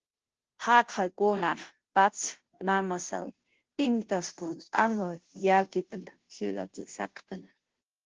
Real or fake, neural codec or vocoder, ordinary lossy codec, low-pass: fake; codec, 16 kHz, 0.5 kbps, FunCodec, trained on Chinese and English, 25 frames a second; Opus, 16 kbps; 7.2 kHz